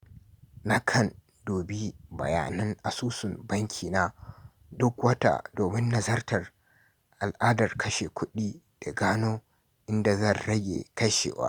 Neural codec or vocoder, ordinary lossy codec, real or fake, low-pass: none; Opus, 64 kbps; real; 19.8 kHz